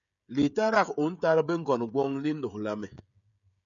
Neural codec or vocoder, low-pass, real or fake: codec, 16 kHz, 16 kbps, FreqCodec, smaller model; 7.2 kHz; fake